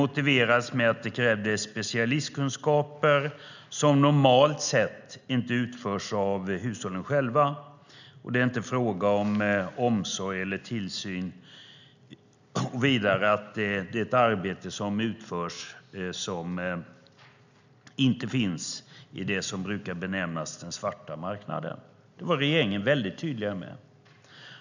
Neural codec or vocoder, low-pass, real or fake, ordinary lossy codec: none; 7.2 kHz; real; none